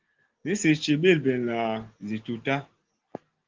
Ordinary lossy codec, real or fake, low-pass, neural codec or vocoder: Opus, 16 kbps; real; 7.2 kHz; none